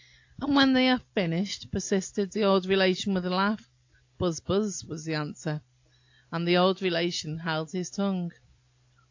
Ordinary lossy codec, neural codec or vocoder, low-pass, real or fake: AAC, 48 kbps; none; 7.2 kHz; real